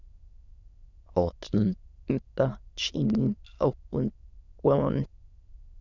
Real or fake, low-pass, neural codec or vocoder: fake; 7.2 kHz; autoencoder, 22.05 kHz, a latent of 192 numbers a frame, VITS, trained on many speakers